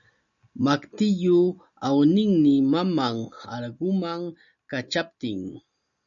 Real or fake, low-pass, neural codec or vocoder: real; 7.2 kHz; none